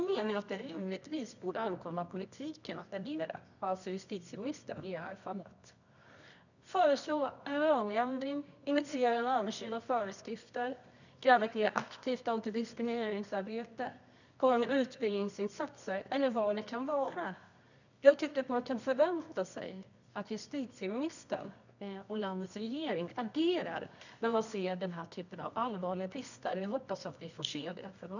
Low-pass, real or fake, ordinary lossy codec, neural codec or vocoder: 7.2 kHz; fake; none; codec, 24 kHz, 0.9 kbps, WavTokenizer, medium music audio release